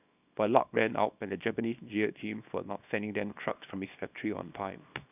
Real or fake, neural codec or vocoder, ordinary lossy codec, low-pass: fake; codec, 24 kHz, 0.9 kbps, WavTokenizer, small release; none; 3.6 kHz